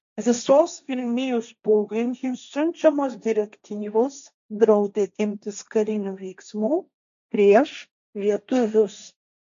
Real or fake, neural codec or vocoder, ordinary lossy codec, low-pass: fake; codec, 16 kHz, 1.1 kbps, Voila-Tokenizer; MP3, 64 kbps; 7.2 kHz